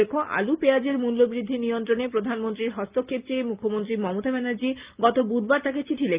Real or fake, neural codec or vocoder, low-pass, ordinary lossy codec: real; none; 3.6 kHz; Opus, 32 kbps